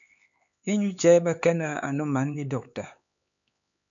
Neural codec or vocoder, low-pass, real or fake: codec, 16 kHz, 4 kbps, X-Codec, HuBERT features, trained on LibriSpeech; 7.2 kHz; fake